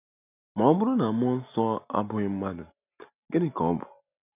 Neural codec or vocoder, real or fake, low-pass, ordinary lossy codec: none; real; 3.6 kHz; none